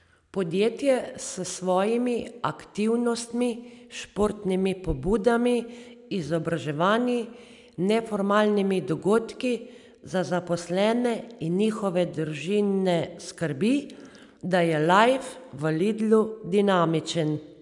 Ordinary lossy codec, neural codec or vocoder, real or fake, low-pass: none; none; real; 10.8 kHz